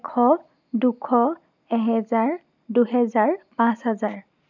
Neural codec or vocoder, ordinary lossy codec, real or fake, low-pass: none; none; real; 7.2 kHz